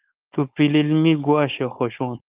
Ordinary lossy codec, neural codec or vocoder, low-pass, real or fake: Opus, 24 kbps; codec, 16 kHz, 4.8 kbps, FACodec; 3.6 kHz; fake